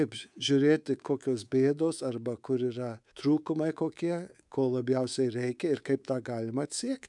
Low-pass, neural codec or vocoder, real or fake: 10.8 kHz; codec, 24 kHz, 3.1 kbps, DualCodec; fake